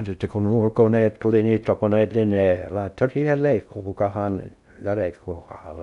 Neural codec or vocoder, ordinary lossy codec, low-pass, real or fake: codec, 16 kHz in and 24 kHz out, 0.6 kbps, FocalCodec, streaming, 2048 codes; none; 10.8 kHz; fake